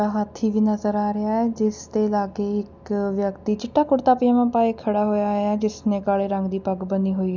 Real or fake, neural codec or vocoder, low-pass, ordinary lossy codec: real; none; 7.2 kHz; none